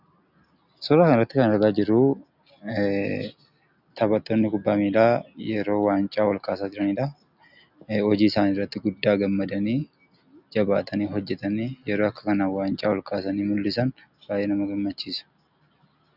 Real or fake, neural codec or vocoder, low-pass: real; none; 5.4 kHz